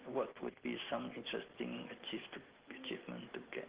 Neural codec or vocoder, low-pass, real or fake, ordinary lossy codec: none; 3.6 kHz; real; Opus, 16 kbps